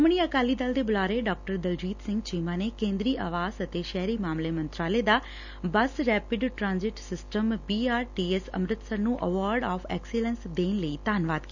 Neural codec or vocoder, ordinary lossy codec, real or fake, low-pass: none; none; real; 7.2 kHz